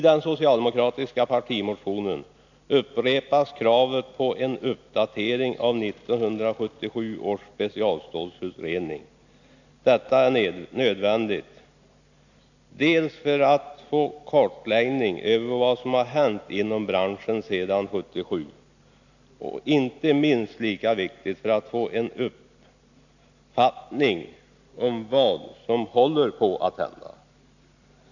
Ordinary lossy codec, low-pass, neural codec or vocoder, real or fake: none; 7.2 kHz; none; real